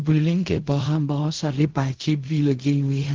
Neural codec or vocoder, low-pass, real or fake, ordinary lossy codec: codec, 16 kHz in and 24 kHz out, 0.4 kbps, LongCat-Audio-Codec, fine tuned four codebook decoder; 7.2 kHz; fake; Opus, 16 kbps